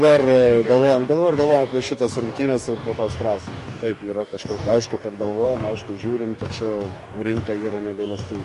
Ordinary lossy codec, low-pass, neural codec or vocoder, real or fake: MP3, 48 kbps; 14.4 kHz; codec, 44.1 kHz, 2.6 kbps, DAC; fake